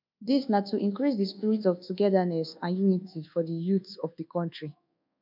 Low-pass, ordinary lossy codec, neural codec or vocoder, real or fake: 5.4 kHz; none; codec, 24 kHz, 1.2 kbps, DualCodec; fake